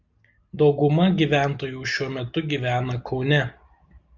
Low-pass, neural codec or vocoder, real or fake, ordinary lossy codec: 7.2 kHz; none; real; Opus, 64 kbps